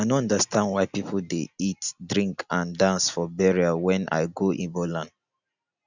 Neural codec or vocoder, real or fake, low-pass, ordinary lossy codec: none; real; 7.2 kHz; none